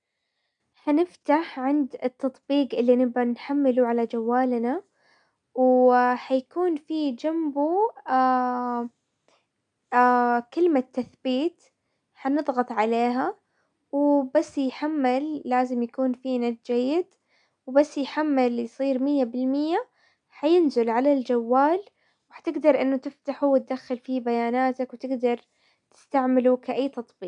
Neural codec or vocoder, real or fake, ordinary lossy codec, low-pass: none; real; none; 9.9 kHz